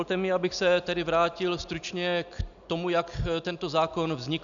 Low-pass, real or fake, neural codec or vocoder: 7.2 kHz; real; none